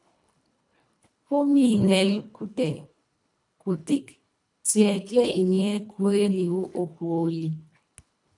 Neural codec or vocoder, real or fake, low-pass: codec, 24 kHz, 1.5 kbps, HILCodec; fake; 10.8 kHz